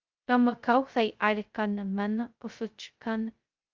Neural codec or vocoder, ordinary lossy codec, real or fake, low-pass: codec, 16 kHz, 0.2 kbps, FocalCodec; Opus, 24 kbps; fake; 7.2 kHz